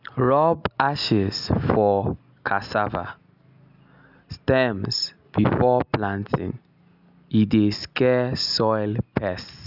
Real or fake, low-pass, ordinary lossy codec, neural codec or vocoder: real; 5.4 kHz; none; none